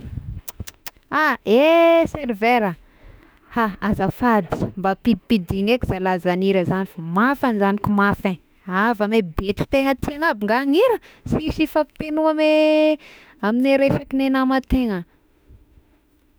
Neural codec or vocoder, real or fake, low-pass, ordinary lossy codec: autoencoder, 48 kHz, 32 numbers a frame, DAC-VAE, trained on Japanese speech; fake; none; none